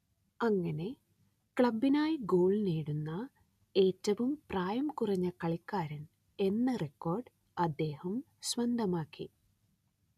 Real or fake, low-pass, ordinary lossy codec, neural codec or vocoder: real; 14.4 kHz; none; none